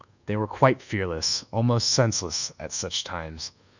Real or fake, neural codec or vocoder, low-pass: fake; codec, 24 kHz, 1.2 kbps, DualCodec; 7.2 kHz